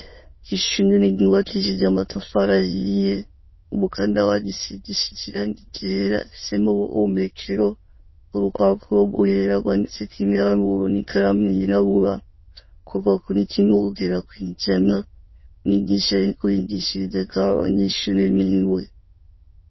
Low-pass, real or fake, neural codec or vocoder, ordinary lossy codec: 7.2 kHz; fake; autoencoder, 22.05 kHz, a latent of 192 numbers a frame, VITS, trained on many speakers; MP3, 24 kbps